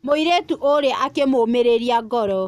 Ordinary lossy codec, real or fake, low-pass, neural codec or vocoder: none; real; 14.4 kHz; none